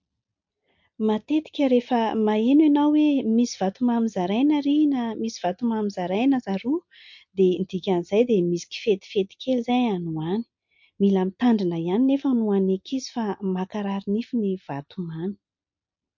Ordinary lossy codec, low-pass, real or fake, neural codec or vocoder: MP3, 48 kbps; 7.2 kHz; real; none